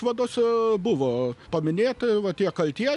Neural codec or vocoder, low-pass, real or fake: none; 10.8 kHz; real